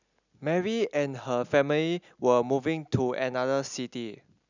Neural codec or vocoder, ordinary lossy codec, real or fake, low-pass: none; none; real; 7.2 kHz